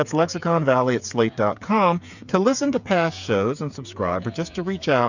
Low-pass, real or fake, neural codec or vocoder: 7.2 kHz; fake; codec, 16 kHz, 8 kbps, FreqCodec, smaller model